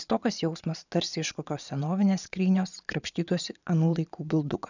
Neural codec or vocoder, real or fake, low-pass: vocoder, 22.05 kHz, 80 mel bands, Vocos; fake; 7.2 kHz